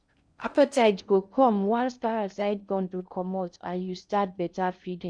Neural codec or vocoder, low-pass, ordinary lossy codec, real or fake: codec, 16 kHz in and 24 kHz out, 0.6 kbps, FocalCodec, streaming, 2048 codes; 9.9 kHz; none; fake